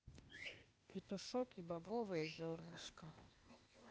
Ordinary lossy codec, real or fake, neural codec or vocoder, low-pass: none; fake; codec, 16 kHz, 0.8 kbps, ZipCodec; none